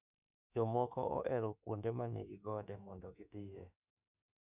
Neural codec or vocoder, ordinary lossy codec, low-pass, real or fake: autoencoder, 48 kHz, 32 numbers a frame, DAC-VAE, trained on Japanese speech; none; 3.6 kHz; fake